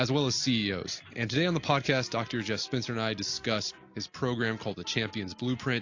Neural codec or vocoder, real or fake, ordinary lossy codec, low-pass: none; real; AAC, 48 kbps; 7.2 kHz